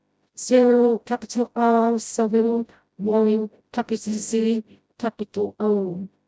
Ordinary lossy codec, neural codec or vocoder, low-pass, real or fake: none; codec, 16 kHz, 0.5 kbps, FreqCodec, smaller model; none; fake